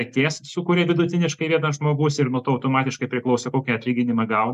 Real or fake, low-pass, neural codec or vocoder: real; 14.4 kHz; none